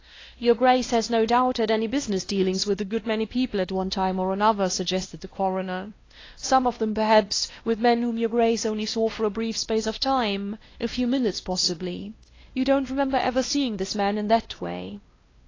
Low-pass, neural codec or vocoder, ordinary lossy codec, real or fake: 7.2 kHz; codec, 16 kHz, 1 kbps, X-Codec, WavLM features, trained on Multilingual LibriSpeech; AAC, 32 kbps; fake